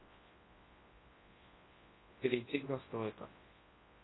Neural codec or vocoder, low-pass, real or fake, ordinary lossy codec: codec, 24 kHz, 0.9 kbps, WavTokenizer, large speech release; 7.2 kHz; fake; AAC, 16 kbps